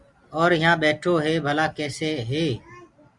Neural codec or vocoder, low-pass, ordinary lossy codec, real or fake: none; 10.8 kHz; Opus, 64 kbps; real